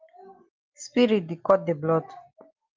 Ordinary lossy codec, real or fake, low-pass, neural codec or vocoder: Opus, 24 kbps; real; 7.2 kHz; none